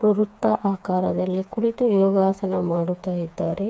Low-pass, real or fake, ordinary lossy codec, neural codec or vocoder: none; fake; none; codec, 16 kHz, 4 kbps, FreqCodec, smaller model